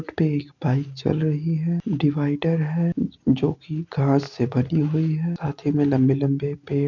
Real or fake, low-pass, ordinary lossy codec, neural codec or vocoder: real; 7.2 kHz; none; none